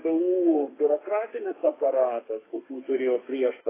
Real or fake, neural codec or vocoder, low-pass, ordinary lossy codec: fake; codec, 32 kHz, 1.9 kbps, SNAC; 3.6 kHz; AAC, 16 kbps